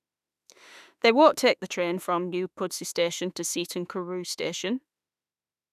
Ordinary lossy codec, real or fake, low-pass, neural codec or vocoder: none; fake; 14.4 kHz; autoencoder, 48 kHz, 32 numbers a frame, DAC-VAE, trained on Japanese speech